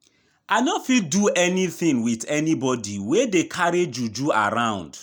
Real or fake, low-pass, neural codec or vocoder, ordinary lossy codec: real; none; none; none